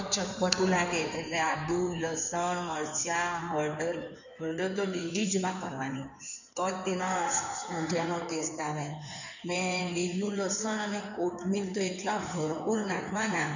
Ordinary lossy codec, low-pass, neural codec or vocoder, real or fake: none; 7.2 kHz; codec, 16 kHz in and 24 kHz out, 2.2 kbps, FireRedTTS-2 codec; fake